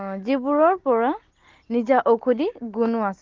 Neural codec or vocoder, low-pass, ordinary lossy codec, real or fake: none; 7.2 kHz; Opus, 16 kbps; real